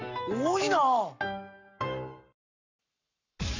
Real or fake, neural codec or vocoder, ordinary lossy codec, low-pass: fake; codec, 44.1 kHz, 7.8 kbps, Pupu-Codec; none; 7.2 kHz